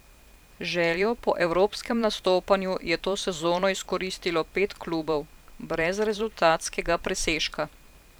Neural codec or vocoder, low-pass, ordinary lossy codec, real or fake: vocoder, 44.1 kHz, 128 mel bands every 512 samples, BigVGAN v2; none; none; fake